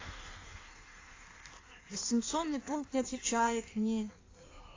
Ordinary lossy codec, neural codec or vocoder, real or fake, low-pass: AAC, 32 kbps; codec, 16 kHz in and 24 kHz out, 1.1 kbps, FireRedTTS-2 codec; fake; 7.2 kHz